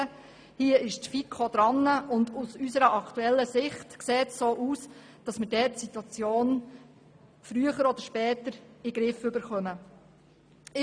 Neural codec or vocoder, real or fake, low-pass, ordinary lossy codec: none; real; 9.9 kHz; none